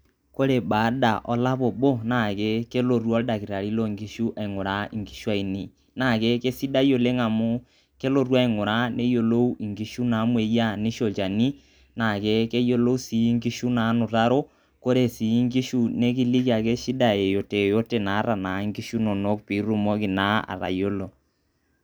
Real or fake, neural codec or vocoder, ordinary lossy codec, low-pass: real; none; none; none